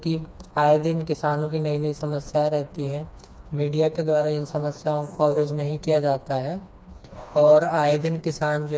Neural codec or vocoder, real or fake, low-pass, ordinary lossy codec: codec, 16 kHz, 2 kbps, FreqCodec, smaller model; fake; none; none